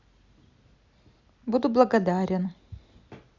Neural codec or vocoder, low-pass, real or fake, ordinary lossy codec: none; 7.2 kHz; real; Opus, 64 kbps